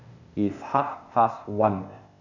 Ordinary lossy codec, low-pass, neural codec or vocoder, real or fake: none; 7.2 kHz; codec, 16 kHz, 0.8 kbps, ZipCodec; fake